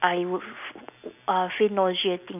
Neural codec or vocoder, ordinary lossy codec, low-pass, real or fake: none; none; 3.6 kHz; real